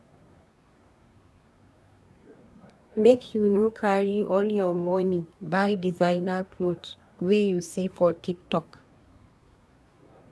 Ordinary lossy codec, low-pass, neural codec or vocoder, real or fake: none; none; codec, 24 kHz, 1 kbps, SNAC; fake